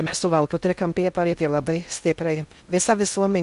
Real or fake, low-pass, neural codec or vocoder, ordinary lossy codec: fake; 10.8 kHz; codec, 16 kHz in and 24 kHz out, 0.6 kbps, FocalCodec, streaming, 2048 codes; MP3, 64 kbps